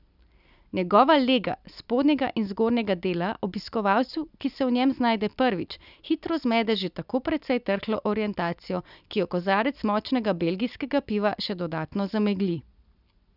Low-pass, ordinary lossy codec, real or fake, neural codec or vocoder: 5.4 kHz; none; real; none